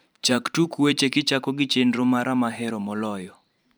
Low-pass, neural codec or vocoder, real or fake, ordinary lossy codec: none; vocoder, 44.1 kHz, 128 mel bands every 512 samples, BigVGAN v2; fake; none